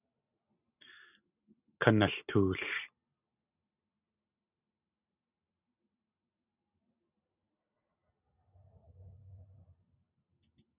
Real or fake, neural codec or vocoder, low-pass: real; none; 3.6 kHz